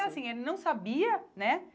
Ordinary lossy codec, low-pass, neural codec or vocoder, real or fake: none; none; none; real